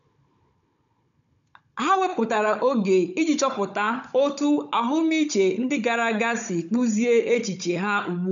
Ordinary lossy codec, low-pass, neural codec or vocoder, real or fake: none; 7.2 kHz; codec, 16 kHz, 16 kbps, FunCodec, trained on Chinese and English, 50 frames a second; fake